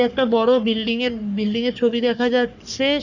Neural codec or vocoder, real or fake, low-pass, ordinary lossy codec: codec, 44.1 kHz, 3.4 kbps, Pupu-Codec; fake; 7.2 kHz; none